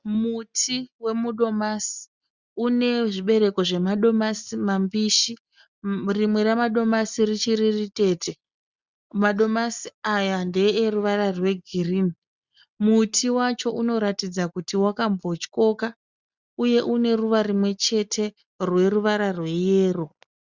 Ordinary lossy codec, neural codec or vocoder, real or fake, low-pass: Opus, 64 kbps; none; real; 7.2 kHz